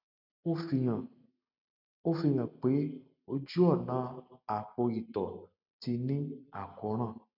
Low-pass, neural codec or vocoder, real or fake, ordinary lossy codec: 5.4 kHz; none; real; none